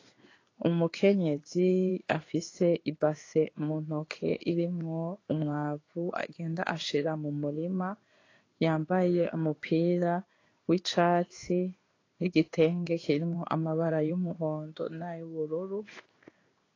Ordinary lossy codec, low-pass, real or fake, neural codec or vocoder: AAC, 32 kbps; 7.2 kHz; fake; codec, 16 kHz in and 24 kHz out, 1 kbps, XY-Tokenizer